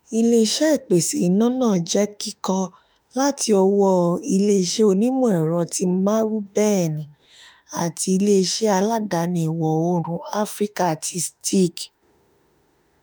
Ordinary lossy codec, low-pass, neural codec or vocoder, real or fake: none; none; autoencoder, 48 kHz, 32 numbers a frame, DAC-VAE, trained on Japanese speech; fake